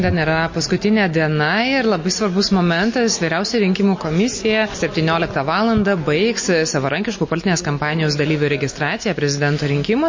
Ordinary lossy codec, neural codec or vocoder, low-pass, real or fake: MP3, 32 kbps; none; 7.2 kHz; real